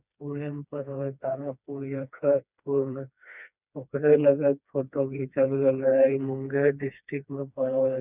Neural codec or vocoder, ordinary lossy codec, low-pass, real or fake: codec, 16 kHz, 2 kbps, FreqCodec, smaller model; none; 3.6 kHz; fake